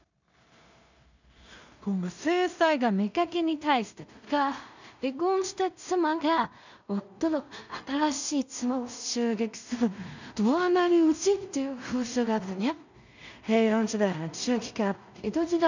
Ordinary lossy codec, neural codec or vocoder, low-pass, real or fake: none; codec, 16 kHz in and 24 kHz out, 0.4 kbps, LongCat-Audio-Codec, two codebook decoder; 7.2 kHz; fake